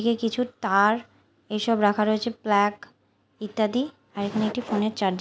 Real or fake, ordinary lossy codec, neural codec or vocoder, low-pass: real; none; none; none